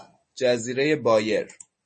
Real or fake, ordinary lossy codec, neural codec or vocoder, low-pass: real; MP3, 32 kbps; none; 10.8 kHz